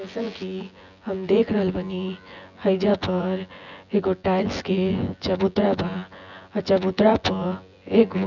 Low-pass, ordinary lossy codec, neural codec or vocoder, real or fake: 7.2 kHz; none; vocoder, 24 kHz, 100 mel bands, Vocos; fake